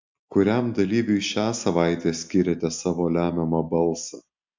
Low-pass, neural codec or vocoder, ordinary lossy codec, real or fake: 7.2 kHz; none; MP3, 64 kbps; real